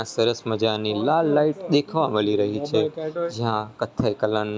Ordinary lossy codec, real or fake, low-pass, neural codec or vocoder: none; real; none; none